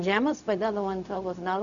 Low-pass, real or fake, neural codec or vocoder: 7.2 kHz; fake; codec, 16 kHz, 0.4 kbps, LongCat-Audio-Codec